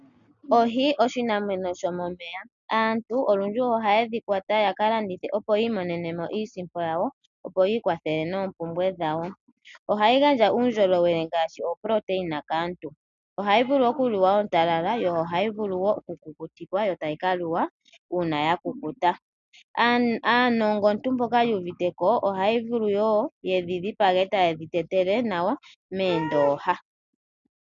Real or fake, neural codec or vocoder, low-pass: real; none; 7.2 kHz